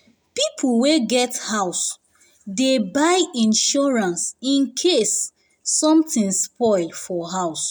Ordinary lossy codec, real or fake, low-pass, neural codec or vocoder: none; real; none; none